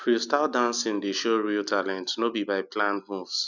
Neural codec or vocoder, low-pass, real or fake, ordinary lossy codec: none; 7.2 kHz; real; none